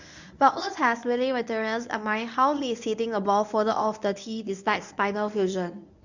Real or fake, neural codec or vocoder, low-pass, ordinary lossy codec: fake; codec, 24 kHz, 0.9 kbps, WavTokenizer, medium speech release version 1; 7.2 kHz; none